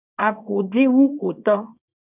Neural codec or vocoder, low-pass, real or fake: codec, 24 kHz, 0.9 kbps, WavTokenizer, small release; 3.6 kHz; fake